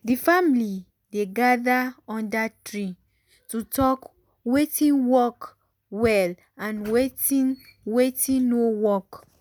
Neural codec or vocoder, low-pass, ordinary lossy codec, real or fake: none; none; none; real